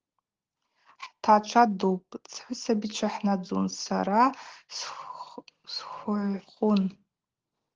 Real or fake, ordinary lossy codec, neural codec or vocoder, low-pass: real; Opus, 16 kbps; none; 7.2 kHz